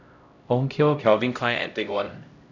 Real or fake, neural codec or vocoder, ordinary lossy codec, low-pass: fake; codec, 16 kHz, 0.5 kbps, X-Codec, HuBERT features, trained on LibriSpeech; none; 7.2 kHz